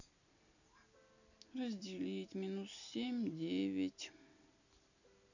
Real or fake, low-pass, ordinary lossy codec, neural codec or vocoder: real; 7.2 kHz; none; none